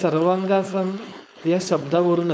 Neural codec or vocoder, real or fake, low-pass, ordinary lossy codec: codec, 16 kHz, 4.8 kbps, FACodec; fake; none; none